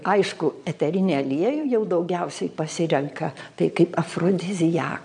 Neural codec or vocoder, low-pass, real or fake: vocoder, 22.05 kHz, 80 mel bands, Vocos; 9.9 kHz; fake